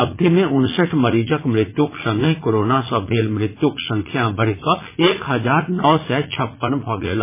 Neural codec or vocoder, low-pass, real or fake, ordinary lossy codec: vocoder, 44.1 kHz, 80 mel bands, Vocos; 3.6 kHz; fake; MP3, 16 kbps